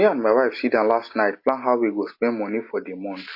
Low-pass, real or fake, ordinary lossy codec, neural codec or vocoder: 5.4 kHz; real; MP3, 32 kbps; none